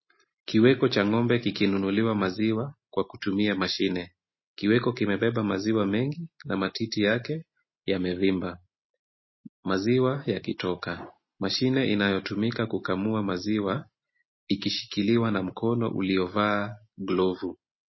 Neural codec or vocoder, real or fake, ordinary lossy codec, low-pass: none; real; MP3, 24 kbps; 7.2 kHz